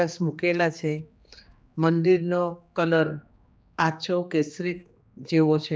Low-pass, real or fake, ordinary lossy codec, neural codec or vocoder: none; fake; none; codec, 16 kHz, 2 kbps, X-Codec, HuBERT features, trained on general audio